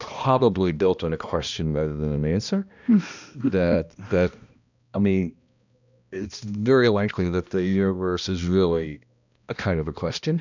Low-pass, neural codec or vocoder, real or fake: 7.2 kHz; codec, 16 kHz, 1 kbps, X-Codec, HuBERT features, trained on balanced general audio; fake